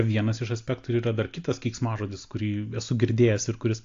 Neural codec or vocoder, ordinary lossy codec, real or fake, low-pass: none; AAC, 48 kbps; real; 7.2 kHz